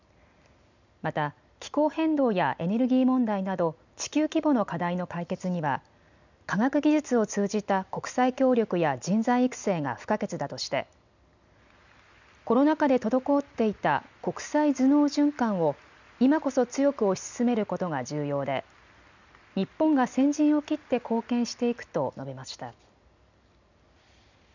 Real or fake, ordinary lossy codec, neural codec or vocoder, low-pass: real; none; none; 7.2 kHz